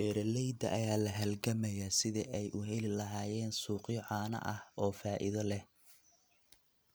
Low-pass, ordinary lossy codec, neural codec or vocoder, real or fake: none; none; none; real